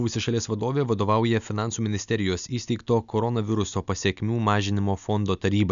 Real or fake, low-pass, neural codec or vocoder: real; 7.2 kHz; none